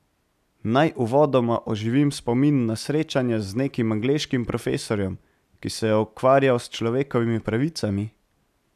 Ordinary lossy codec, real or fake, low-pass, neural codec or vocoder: none; real; 14.4 kHz; none